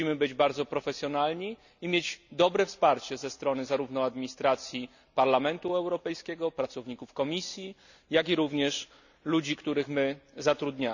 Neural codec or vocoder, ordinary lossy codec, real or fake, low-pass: none; none; real; 7.2 kHz